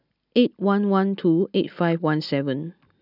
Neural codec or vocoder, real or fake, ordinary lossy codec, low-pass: none; real; none; 5.4 kHz